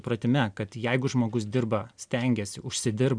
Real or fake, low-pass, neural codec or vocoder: real; 9.9 kHz; none